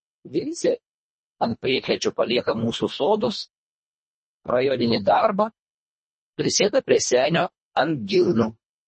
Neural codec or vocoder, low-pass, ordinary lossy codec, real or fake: codec, 24 kHz, 1.5 kbps, HILCodec; 10.8 kHz; MP3, 32 kbps; fake